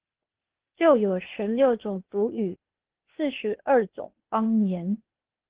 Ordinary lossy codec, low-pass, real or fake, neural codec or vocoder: Opus, 16 kbps; 3.6 kHz; fake; codec, 16 kHz, 0.8 kbps, ZipCodec